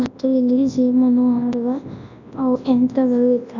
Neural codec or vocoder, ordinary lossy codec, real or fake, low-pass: codec, 24 kHz, 0.9 kbps, WavTokenizer, large speech release; none; fake; 7.2 kHz